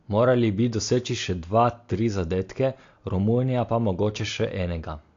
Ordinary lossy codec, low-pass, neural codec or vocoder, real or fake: AAC, 48 kbps; 7.2 kHz; none; real